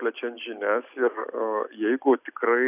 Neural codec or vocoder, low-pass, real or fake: none; 3.6 kHz; real